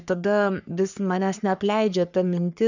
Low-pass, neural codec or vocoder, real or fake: 7.2 kHz; codec, 44.1 kHz, 3.4 kbps, Pupu-Codec; fake